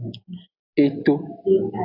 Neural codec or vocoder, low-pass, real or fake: none; 5.4 kHz; real